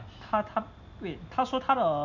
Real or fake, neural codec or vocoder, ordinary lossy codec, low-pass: real; none; none; 7.2 kHz